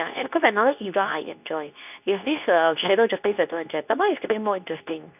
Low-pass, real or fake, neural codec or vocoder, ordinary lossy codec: 3.6 kHz; fake; codec, 24 kHz, 0.9 kbps, WavTokenizer, medium speech release version 2; none